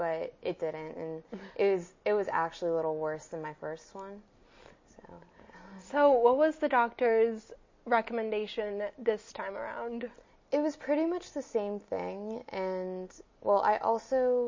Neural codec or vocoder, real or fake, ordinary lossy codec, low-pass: none; real; MP3, 32 kbps; 7.2 kHz